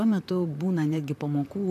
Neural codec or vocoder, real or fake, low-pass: vocoder, 44.1 kHz, 128 mel bands, Pupu-Vocoder; fake; 14.4 kHz